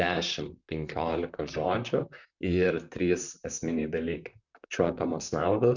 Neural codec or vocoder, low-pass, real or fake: vocoder, 44.1 kHz, 128 mel bands, Pupu-Vocoder; 7.2 kHz; fake